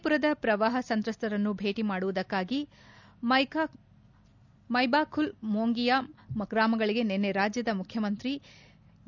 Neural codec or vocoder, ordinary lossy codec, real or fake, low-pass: none; none; real; 7.2 kHz